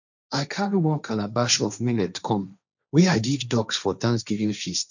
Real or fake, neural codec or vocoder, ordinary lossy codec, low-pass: fake; codec, 16 kHz, 1.1 kbps, Voila-Tokenizer; none; 7.2 kHz